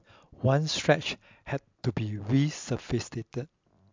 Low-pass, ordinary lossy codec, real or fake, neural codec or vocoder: 7.2 kHz; MP3, 64 kbps; real; none